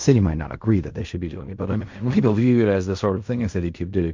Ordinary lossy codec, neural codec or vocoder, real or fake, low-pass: MP3, 48 kbps; codec, 16 kHz in and 24 kHz out, 0.4 kbps, LongCat-Audio-Codec, fine tuned four codebook decoder; fake; 7.2 kHz